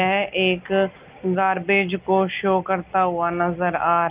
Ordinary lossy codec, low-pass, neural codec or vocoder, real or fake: Opus, 32 kbps; 3.6 kHz; none; real